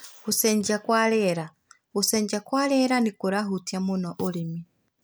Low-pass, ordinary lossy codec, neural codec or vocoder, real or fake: none; none; none; real